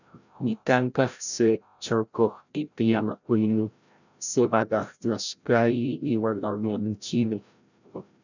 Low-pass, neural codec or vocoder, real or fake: 7.2 kHz; codec, 16 kHz, 0.5 kbps, FreqCodec, larger model; fake